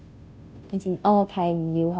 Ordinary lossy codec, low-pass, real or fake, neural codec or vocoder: none; none; fake; codec, 16 kHz, 0.5 kbps, FunCodec, trained on Chinese and English, 25 frames a second